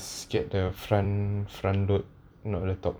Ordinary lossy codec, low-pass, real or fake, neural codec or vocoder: none; none; fake; vocoder, 44.1 kHz, 128 mel bands every 256 samples, BigVGAN v2